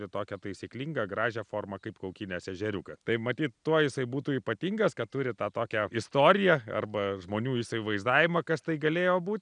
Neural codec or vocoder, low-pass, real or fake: none; 9.9 kHz; real